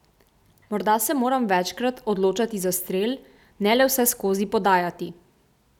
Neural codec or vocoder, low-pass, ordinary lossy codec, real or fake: none; 19.8 kHz; none; real